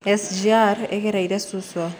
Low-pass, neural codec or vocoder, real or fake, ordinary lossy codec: none; none; real; none